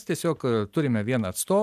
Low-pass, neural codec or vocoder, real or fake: 14.4 kHz; none; real